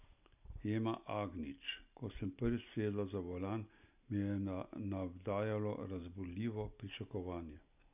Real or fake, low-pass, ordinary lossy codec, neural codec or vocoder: real; 3.6 kHz; none; none